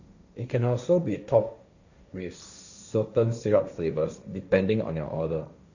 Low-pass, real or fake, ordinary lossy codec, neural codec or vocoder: 7.2 kHz; fake; none; codec, 16 kHz, 1.1 kbps, Voila-Tokenizer